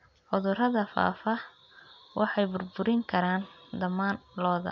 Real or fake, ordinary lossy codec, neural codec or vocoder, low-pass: real; none; none; 7.2 kHz